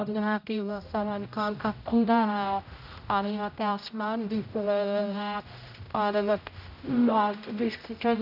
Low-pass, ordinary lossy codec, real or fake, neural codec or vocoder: 5.4 kHz; none; fake; codec, 16 kHz, 0.5 kbps, X-Codec, HuBERT features, trained on general audio